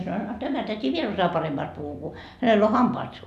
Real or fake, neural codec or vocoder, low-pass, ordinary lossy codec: real; none; 14.4 kHz; none